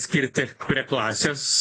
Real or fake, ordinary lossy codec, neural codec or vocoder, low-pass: real; AAC, 32 kbps; none; 9.9 kHz